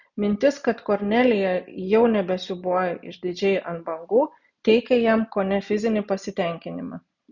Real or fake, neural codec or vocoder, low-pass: real; none; 7.2 kHz